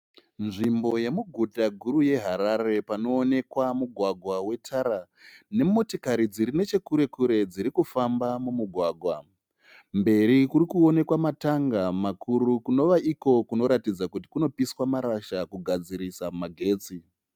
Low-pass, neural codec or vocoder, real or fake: 19.8 kHz; none; real